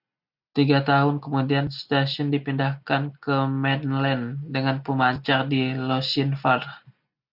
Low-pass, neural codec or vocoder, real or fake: 5.4 kHz; none; real